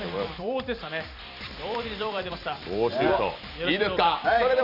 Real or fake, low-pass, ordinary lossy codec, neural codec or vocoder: fake; 5.4 kHz; none; vocoder, 44.1 kHz, 128 mel bands every 256 samples, BigVGAN v2